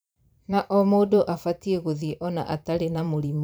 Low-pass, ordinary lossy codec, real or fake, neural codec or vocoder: none; none; fake; vocoder, 44.1 kHz, 128 mel bands every 512 samples, BigVGAN v2